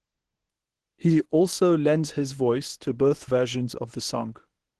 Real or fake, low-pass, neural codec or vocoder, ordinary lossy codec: fake; 10.8 kHz; codec, 24 kHz, 0.9 kbps, WavTokenizer, medium speech release version 1; Opus, 16 kbps